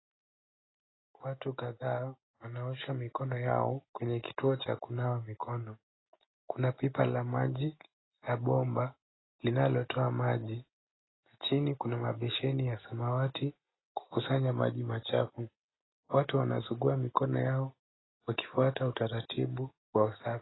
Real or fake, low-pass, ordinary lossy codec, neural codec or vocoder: real; 7.2 kHz; AAC, 16 kbps; none